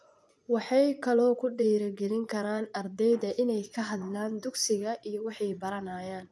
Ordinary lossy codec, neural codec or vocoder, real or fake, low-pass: none; vocoder, 24 kHz, 100 mel bands, Vocos; fake; none